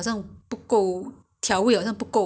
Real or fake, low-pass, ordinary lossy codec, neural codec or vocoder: real; none; none; none